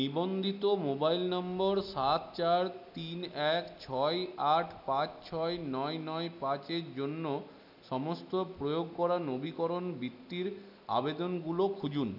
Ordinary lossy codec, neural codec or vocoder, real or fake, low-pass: none; none; real; 5.4 kHz